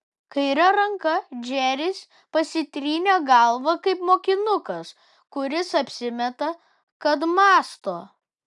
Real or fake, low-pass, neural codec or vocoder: real; 10.8 kHz; none